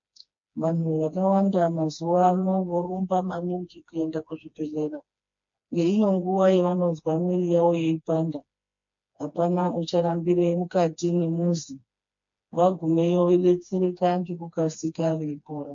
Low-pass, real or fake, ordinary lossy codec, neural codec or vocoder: 7.2 kHz; fake; MP3, 48 kbps; codec, 16 kHz, 2 kbps, FreqCodec, smaller model